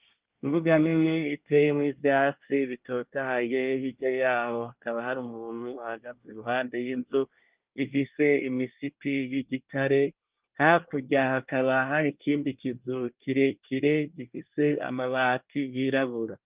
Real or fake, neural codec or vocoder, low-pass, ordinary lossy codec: fake; codec, 24 kHz, 1 kbps, SNAC; 3.6 kHz; Opus, 32 kbps